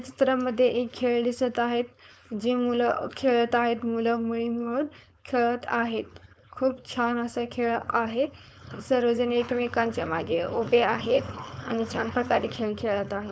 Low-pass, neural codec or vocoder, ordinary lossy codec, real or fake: none; codec, 16 kHz, 4.8 kbps, FACodec; none; fake